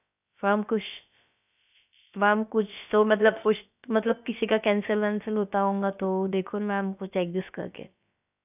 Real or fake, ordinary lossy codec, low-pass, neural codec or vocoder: fake; none; 3.6 kHz; codec, 16 kHz, about 1 kbps, DyCAST, with the encoder's durations